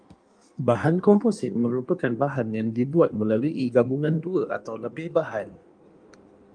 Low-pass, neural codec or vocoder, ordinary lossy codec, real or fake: 9.9 kHz; codec, 16 kHz in and 24 kHz out, 1.1 kbps, FireRedTTS-2 codec; Opus, 32 kbps; fake